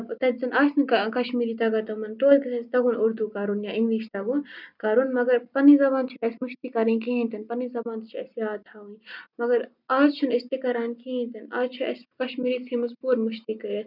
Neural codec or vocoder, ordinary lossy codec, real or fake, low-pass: none; none; real; 5.4 kHz